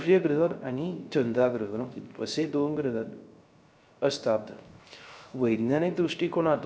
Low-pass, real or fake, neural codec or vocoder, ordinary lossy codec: none; fake; codec, 16 kHz, 0.3 kbps, FocalCodec; none